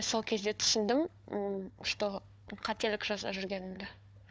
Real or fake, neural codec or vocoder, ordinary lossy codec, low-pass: fake; codec, 16 kHz, 4 kbps, FunCodec, trained on Chinese and English, 50 frames a second; none; none